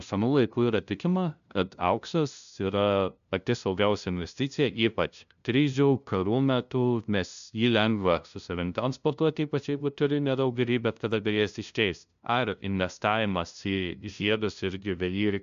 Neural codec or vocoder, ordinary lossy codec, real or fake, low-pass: codec, 16 kHz, 0.5 kbps, FunCodec, trained on LibriTTS, 25 frames a second; AAC, 96 kbps; fake; 7.2 kHz